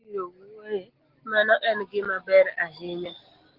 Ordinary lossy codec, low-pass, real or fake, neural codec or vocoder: Opus, 16 kbps; 5.4 kHz; real; none